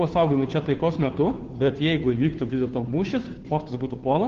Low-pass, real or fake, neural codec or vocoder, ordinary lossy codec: 7.2 kHz; fake; codec, 16 kHz, 2 kbps, FunCodec, trained on Chinese and English, 25 frames a second; Opus, 16 kbps